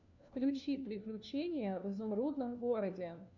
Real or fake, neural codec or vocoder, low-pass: fake; codec, 16 kHz, 1 kbps, FunCodec, trained on LibriTTS, 50 frames a second; 7.2 kHz